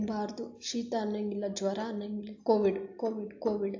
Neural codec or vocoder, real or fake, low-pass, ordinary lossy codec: none; real; 7.2 kHz; AAC, 48 kbps